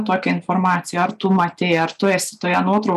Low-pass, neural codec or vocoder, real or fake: 14.4 kHz; none; real